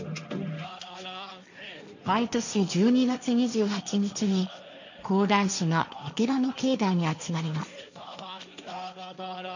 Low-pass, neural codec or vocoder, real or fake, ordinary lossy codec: 7.2 kHz; codec, 16 kHz, 1.1 kbps, Voila-Tokenizer; fake; none